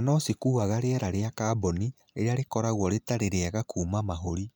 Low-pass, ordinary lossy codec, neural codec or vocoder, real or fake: none; none; none; real